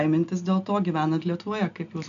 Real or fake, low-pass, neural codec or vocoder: real; 7.2 kHz; none